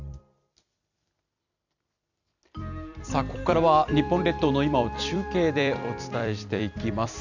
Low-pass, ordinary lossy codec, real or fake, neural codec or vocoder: 7.2 kHz; none; fake; vocoder, 44.1 kHz, 128 mel bands every 256 samples, BigVGAN v2